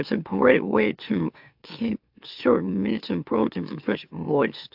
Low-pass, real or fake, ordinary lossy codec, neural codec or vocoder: 5.4 kHz; fake; AAC, 48 kbps; autoencoder, 44.1 kHz, a latent of 192 numbers a frame, MeloTTS